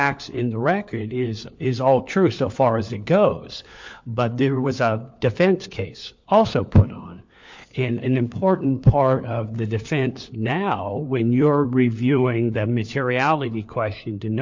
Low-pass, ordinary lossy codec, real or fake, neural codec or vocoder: 7.2 kHz; MP3, 48 kbps; fake; codec, 16 kHz, 4 kbps, FunCodec, trained on LibriTTS, 50 frames a second